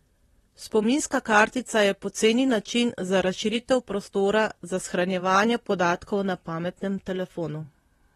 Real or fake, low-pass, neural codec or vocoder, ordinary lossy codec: fake; 19.8 kHz; vocoder, 44.1 kHz, 128 mel bands, Pupu-Vocoder; AAC, 32 kbps